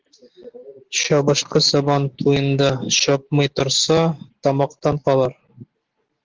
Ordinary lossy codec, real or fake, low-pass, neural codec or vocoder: Opus, 16 kbps; real; 7.2 kHz; none